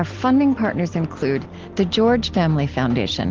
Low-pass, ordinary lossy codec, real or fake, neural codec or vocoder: 7.2 kHz; Opus, 16 kbps; real; none